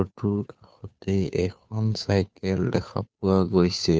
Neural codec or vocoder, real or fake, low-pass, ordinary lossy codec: codec, 16 kHz, 2 kbps, FunCodec, trained on Chinese and English, 25 frames a second; fake; none; none